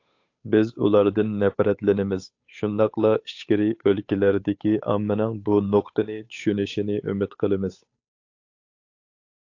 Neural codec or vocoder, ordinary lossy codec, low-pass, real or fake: codec, 16 kHz, 8 kbps, FunCodec, trained on Chinese and English, 25 frames a second; AAC, 48 kbps; 7.2 kHz; fake